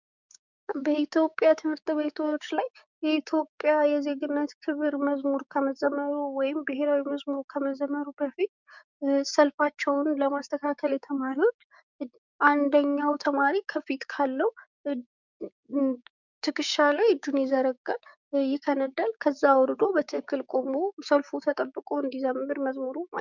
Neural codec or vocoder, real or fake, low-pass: codec, 16 kHz, 6 kbps, DAC; fake; 7.2 kHz